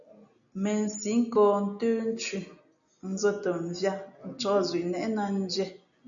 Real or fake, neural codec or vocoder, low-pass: real; none; 7.2 kHz